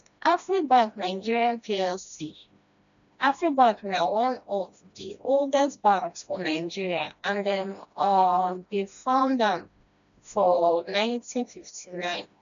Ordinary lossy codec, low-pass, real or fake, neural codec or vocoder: none; 7.2 kHz; fake; codec, 16 kHz, 1 kbps, FreqCodec, smaller model